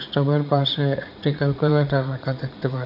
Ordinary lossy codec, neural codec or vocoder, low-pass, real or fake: AAC, 48 kbps; vocoder, 44.1 kHz, 80 mel bands, Vocos; 5.4 kHz; fake